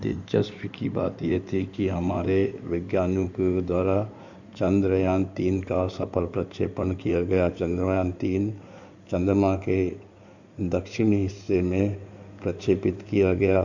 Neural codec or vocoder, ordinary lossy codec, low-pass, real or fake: codec, 16 kHz in and 24 kHz out, 2.2 kbps, FireRedTTS-2 codec; none; 7.2 kHz; fake